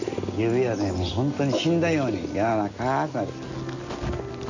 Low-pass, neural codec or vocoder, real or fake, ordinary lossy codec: 7.2 kHz; none; real; none